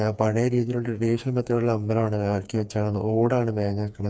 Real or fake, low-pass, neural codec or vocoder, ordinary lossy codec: fake; none; codec, 16 kHz, 4 kbps, FreqCodec, smaller model; none